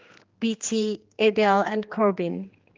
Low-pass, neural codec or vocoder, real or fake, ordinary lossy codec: 7.2 kHz; codec, 16 kHz, 2 kbps, X-Codec, HuBERT features, trained on general audio; fake; Opus, 32 kbps